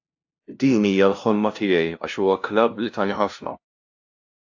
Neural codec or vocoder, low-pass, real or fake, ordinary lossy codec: codec, 16 kHz, 0.5 kbps, FunCodec, trained on LibriTTS, 25 frames a second; 7.2 kHz; fake; AAC, 48 kbps